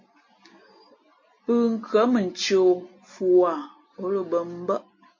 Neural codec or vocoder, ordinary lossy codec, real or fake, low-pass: none; MP3, 32 kbps; real; 7.2 kHz